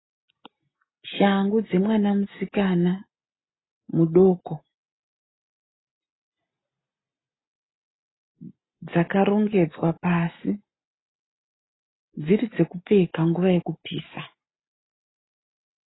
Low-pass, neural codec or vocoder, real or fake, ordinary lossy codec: 7.2 kHz; none; real; AAC, 16 kbps